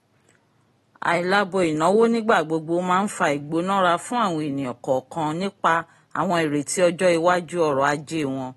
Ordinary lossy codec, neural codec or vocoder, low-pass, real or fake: AAC, 32 kbps; none; 19.8 kHz; real